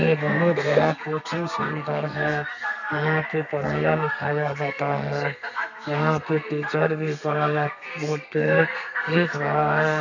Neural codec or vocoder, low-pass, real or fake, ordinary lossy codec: codec, 32 kHz, 1.9 kbps, SNAC; 7.2 kHz; fake; none